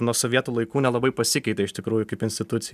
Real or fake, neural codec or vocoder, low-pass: real; none; 14.4 kHz